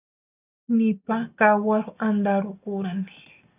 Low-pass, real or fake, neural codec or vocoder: 3.6 kHz; real; none